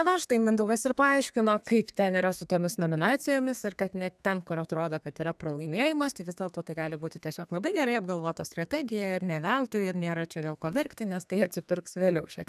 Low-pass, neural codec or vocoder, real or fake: 14.4 kHz; codec, 32 kHz, 1.9 kbps, SNAC; fake